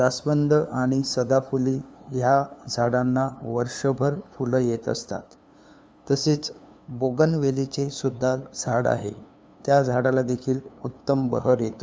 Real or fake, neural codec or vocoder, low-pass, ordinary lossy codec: fake; codec, 16 kHz, 2 kbps, FunCodec, trained on LibriTTS, 25 frames a second; none; none